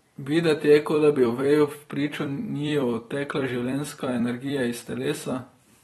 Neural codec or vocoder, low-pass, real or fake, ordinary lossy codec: vocoder, 44.1 kHz, 128 mel bands every 256 samples, BigVGAN v2; 19.8 kHz; fake; AAC, 32 kbps